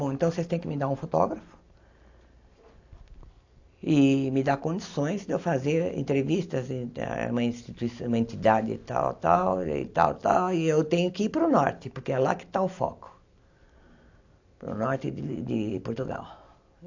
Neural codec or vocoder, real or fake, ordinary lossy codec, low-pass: none; real; AAC, 48 kbps; 7.2 kHz